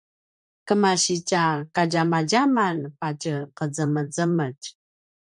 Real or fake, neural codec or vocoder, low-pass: fake; vocoder, 44.1 kHz, 128 mel bands, Pupu-Vocoder; 10.8 kHz